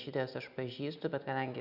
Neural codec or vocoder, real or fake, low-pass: none; real; 5.4 kHz